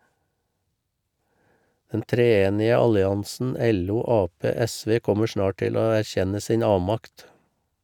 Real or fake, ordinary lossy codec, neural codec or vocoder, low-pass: real; none; none; 19.8 kHz